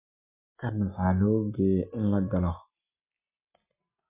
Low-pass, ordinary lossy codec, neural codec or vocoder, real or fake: 3.6 kHz; MP3, 32 kbps; none; real